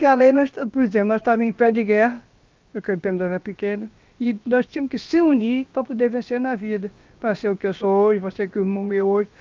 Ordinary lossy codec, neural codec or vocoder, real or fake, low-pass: Opus, 24 kbps; codec, 16 kHz, about 1 kbps, DyCAST, with the encoder's durations; fake; 7.2 kHz